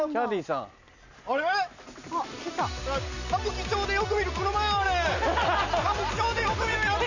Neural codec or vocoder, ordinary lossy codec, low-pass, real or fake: none; none; 7.2 kHz; real